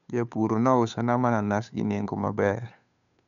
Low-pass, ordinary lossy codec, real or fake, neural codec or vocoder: 7.2 kHz; none; fake; codec, 16 kHz, 2 kbps, FunCodec, trained on Chinese and English, 25 frames a second